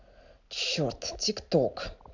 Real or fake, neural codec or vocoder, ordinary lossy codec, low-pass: real; none; none; 7.2 kHz